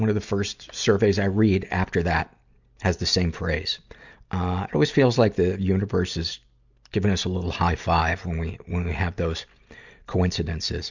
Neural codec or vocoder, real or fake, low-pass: none; real; 7.2 kHz